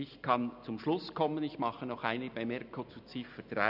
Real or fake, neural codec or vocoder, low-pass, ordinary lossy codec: real; none; 5.4 kHz; none